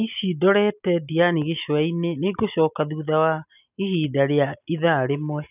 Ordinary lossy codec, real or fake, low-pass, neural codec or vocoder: none; real; 3.6 kHz; none